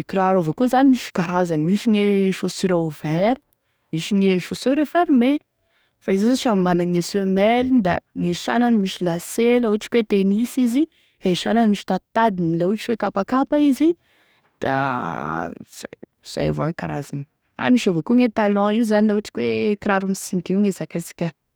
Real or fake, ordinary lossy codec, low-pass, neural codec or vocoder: fake; none; none; codec, 44.1 kHz, 2.6 kbps, DAC